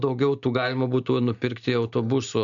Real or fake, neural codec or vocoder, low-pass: real; none; 7.2 kHz